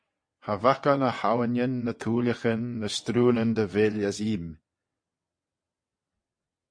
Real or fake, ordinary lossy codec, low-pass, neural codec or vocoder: fake; MP3, 48 kbps; 9.9 kHz; vocoder, 22.05 kHz, 80 mel bands, WaveNeXt